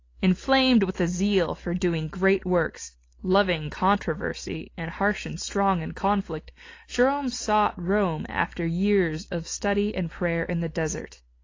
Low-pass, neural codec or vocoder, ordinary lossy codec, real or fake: 7.2 kHz; none; AAC, 32 kbps; real